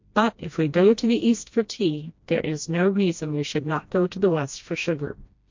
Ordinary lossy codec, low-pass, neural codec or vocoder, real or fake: MP3, 48 kbps; 7.2 kHz; codec, 16 kHz, 1 kbps, FreqCodec, smaller model; fake